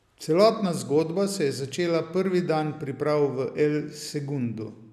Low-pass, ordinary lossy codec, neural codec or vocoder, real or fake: 14.4 kHz; none; none; real